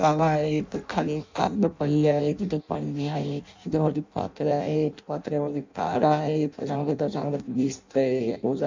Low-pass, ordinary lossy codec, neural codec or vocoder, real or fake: 7.2 kHz; MP3, 64 kbps; codec, 16 kHz in and 24 kHz out, 0.6 kbps, FireRedTTS-2 codec; fake